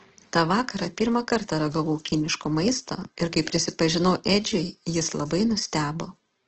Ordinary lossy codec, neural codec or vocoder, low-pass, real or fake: Opus, 16 kbps; none; 7.2 kHz; real